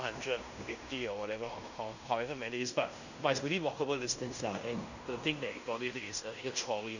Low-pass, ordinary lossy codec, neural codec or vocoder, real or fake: 7.2 kHz; none; codec, 16 kHz in and 24 kHz out, 0.9 kbps, LongCat-Audio-Codec, fine tuned four codebook decoder; fake